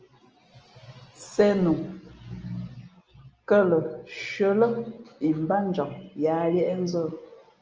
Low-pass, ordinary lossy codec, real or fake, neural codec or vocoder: 7.2 kHz; Opus, 24 kbps; real; none